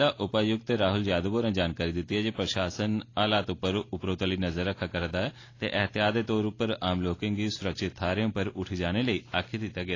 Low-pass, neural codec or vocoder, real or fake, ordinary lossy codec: 7.2 kHz; none; real; AAC, 32 kbps